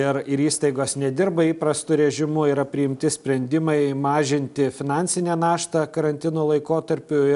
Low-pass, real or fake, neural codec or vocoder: 10.8 kHz; real; none